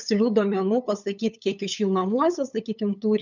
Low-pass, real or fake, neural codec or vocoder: 7.2 kHz; fake; codec, 16 kHz, 8 kbps, FunCodec, trained on LibriTTS, 25 frames a second